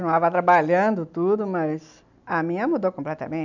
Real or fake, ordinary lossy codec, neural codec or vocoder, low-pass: real; none; none; 7.2 kHz